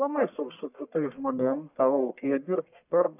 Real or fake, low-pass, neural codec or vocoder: fake; 3.6 kHz; codec, 44.1 kHz, 1.7 kbps, Pupu-Codec